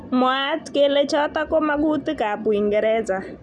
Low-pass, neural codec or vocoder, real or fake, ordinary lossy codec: none; none; real; none